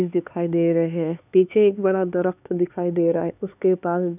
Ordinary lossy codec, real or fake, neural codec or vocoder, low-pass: none; fake; codec, 16 kHz, 4 kbps, X-Codec, HuBERT features, trained on LibriSpeech; 3.6 kHz